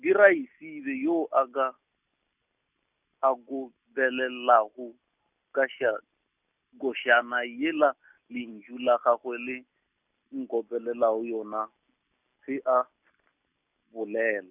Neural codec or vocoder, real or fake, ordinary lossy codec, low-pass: none; real; none; 3.6 kHz